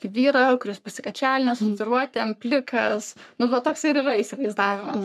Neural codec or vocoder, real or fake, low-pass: codec, 44.1 kHz, 3.4 kbps, Pupu-Codec; fake; 14.4 kHz